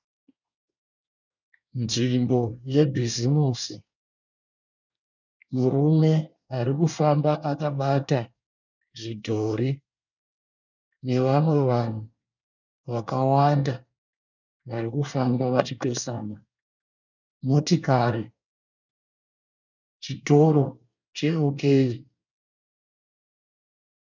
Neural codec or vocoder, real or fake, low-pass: codec, 24 kHz, 1 kbps, SNAC; fake; 7.2 kHz